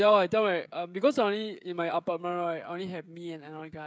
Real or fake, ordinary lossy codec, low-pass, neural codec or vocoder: fake; none; none; codec, 16 kHz, 16 kbps, FreqCodec, smaller model